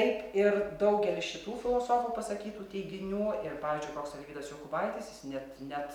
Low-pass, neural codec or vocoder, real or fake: 19.8 kHz; none; real